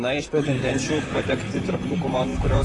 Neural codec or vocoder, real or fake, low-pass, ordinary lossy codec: vocoder, 44.1 kHz, 128 mel bands, Pupu-Vocoder; fake; 10.8 kHz; AAC, 32 kbps